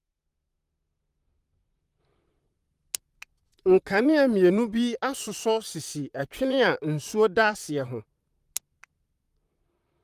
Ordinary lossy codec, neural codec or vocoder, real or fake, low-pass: Opus, 32 kbps; vocoder, 44.1 kHz, 128 mel bands, Pupu-Vocoder; fake; 14.4 kHz